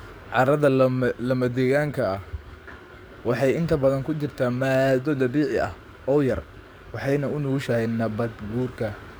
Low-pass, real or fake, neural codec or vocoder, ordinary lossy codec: none; fake; codec, 44.1 kHz, 7.8 kbps, DAC; none